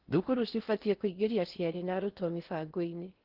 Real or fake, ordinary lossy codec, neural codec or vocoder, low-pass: fake; Opus, 16 kbps; codec, 16 kHz in and 24 kHz out, 0.6 kbps, FocalCodec, streaming, 4096 codes; 5.4 kHz